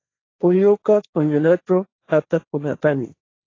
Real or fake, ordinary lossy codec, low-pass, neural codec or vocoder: fake; AAC, 32 kbps; 7.2 kHz; codec, 16 kHz, 1.1 kbps, Voila-Tokenizer